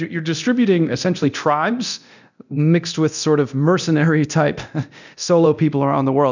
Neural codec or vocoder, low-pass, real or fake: codec, 24 kHz, 0.9 kbps, DualCodec; 7.2 kHz; fake